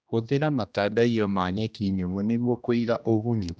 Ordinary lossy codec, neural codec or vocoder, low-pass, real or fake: none; codec, 16 kHz, 1 kbps, X-Codec, HuBERT features, trained on general audio; none; fake